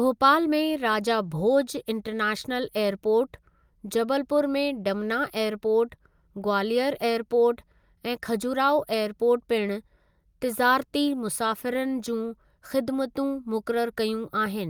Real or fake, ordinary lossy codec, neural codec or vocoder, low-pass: real; Opus, 32 kbps; none; 19.8 kHz